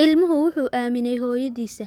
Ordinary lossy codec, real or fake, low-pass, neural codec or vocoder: none; fake; 19.8 kHz; codec, 44.1 kHz, 7.8 kbps, DAC